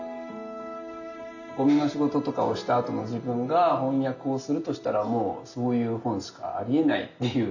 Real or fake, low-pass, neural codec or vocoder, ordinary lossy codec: real; 7.2 kHz; none; none